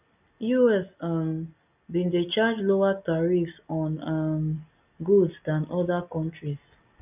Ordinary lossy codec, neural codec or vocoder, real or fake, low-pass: none; none; real; 3.6 kHz